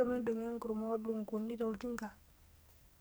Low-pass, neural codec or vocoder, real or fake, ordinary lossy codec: none; codec, 44.1 kHz, 2.6 kbps, SNAC; fake; none